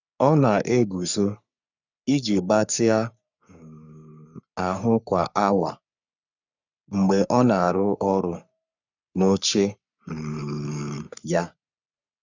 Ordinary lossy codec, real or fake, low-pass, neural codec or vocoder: none; fake; 7.2 kHz; codec, 44.1 kHz, 3.4 kbps, Pupu-Codec